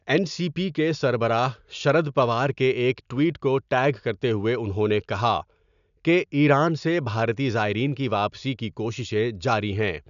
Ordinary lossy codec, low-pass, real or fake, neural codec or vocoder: none; 7.2 kHz; real; none